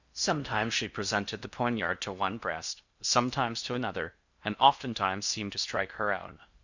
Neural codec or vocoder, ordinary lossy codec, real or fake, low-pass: codec, 16 kHz in and 24 kHz out, 0.6 kbps, FocalCodec, streaming, 4096 codes; Opus, 64 kbps; fake; 7.2 kHz